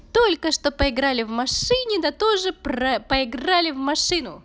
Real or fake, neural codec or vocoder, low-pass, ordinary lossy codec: real; none; none; none